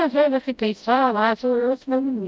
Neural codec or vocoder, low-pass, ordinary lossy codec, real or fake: codec, 16 kHz, 0.5 kbps, FreqCodec, smaller model; none; none; fake